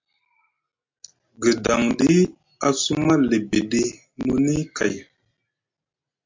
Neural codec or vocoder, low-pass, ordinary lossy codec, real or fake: none; 7.2 kHz; MP3, 48 kbps; real